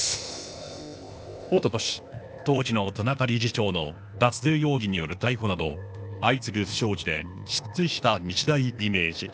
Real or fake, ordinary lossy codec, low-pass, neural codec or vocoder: fake; none; none; codec, 16 kHz, 0.8 kbps, ZipCodec